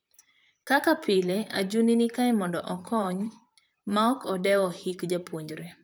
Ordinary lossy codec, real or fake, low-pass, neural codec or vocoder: none; fake; none; vocoder, 44.1 kHz, 128 mel bands, Pupu-Vocoder